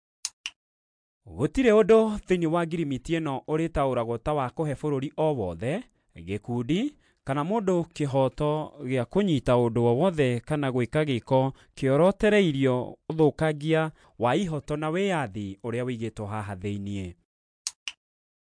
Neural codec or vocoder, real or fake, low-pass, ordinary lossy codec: none; real; 9.9 kHz; MP3, 64 kbps